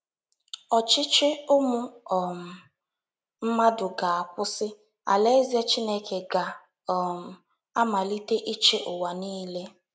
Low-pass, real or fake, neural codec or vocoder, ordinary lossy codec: none; real; none; none